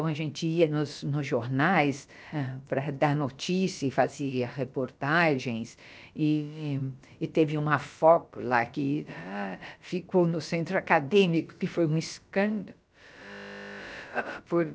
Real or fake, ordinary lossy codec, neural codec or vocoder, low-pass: fake; none; codec, 16 kHz, about 1 kbps, DyCAST, with the encoder's durations; none